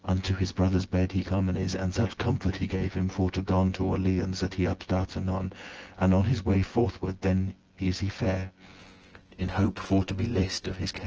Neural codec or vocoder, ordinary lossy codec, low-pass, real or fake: vocoder, 24 kHz, 100 mel bands, Vocos; Opus, 16 kbps; 7.2 kHz; fake